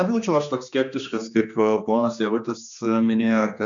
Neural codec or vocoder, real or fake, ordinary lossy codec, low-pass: codec, 16 kHz, 2 kbps, X-Codec, HuBERT features, trained on general audio; fake; MP3, 64 kbps; 7.2 kHz